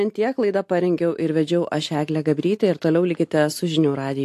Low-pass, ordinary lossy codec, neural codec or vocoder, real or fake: 14.4 kHz; AAC, 64 kbps; none; real